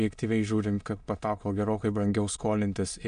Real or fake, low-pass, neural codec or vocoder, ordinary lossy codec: fake; 9.9 kHz; autoencoder, 22.05 kHz, a latent of 192 numbers a frame, VITS, trained on many speakers; MP3, 64 kbps